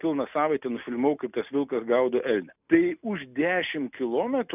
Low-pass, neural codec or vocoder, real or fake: 3.6 kHz; none; real